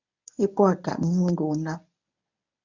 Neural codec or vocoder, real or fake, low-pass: codec, 24 kHz, 0.9 kbps, WavTokenizer, medium speech release version 1; fake; 7.2 kHz